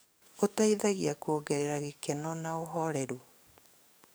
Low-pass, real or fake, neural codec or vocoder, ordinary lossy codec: none; fake; codec, 44.1 kHz, 7.8 kbps, DAC; none